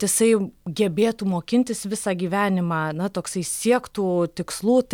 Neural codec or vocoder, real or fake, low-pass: none; real; 19.8 kHz